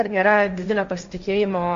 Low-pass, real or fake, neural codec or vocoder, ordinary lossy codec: 7.2 kHz; fake; codec, 16 kHz, 1.1 kbps, Voila-Tokenizer; MP3, 48 kbps